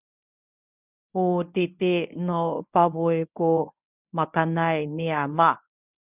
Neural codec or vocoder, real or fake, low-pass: codec, 24 kHz, 0.9 kbps, WavTokenizer, medium speech release version 1; fake; 3.6 kHz